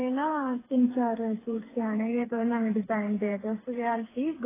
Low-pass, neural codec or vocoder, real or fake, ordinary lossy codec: 3.6 kHz; codec, 16 kHz, 4 kbps, FreqCodec, smaller model; fake; AAC, 16 kbps